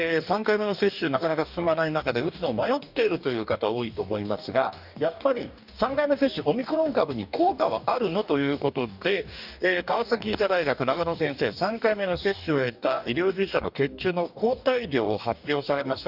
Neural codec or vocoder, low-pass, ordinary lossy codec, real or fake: codec, 44.1 kHz, 2.6 kbps, DAC; 5.4 kHz; none; fake